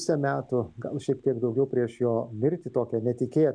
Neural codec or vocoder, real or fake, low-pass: none; real; 9.9 kHz